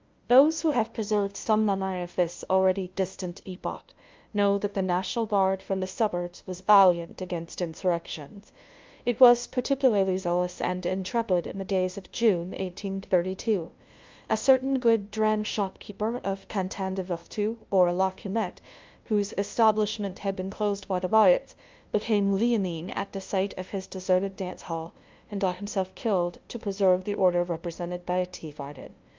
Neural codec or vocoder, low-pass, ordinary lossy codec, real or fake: codec, 16 kHz, 0.5 kbps, FunCodec, trained on LibriTTS, 25 frames a second; 7.2 kHz; Opus, 24 kbps; fake